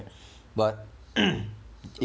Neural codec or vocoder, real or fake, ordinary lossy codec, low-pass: none; real; none; none